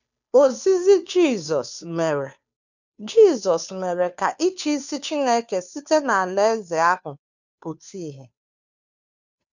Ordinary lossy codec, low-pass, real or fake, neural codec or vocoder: none; 7.2 kHz; fake; codec, 16 kHz, 2 kbps, FunCodec, trained on Chinese and English, 25 frames a second